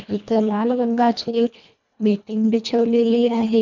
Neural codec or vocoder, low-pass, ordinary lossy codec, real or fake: codec, 24 kHz, 1.5 kbps, HILCodec; 7.2 kHz; none; fake